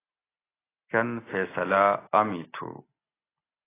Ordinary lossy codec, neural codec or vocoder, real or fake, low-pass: AAC, 16 kbps; none; real; 3.6 kHz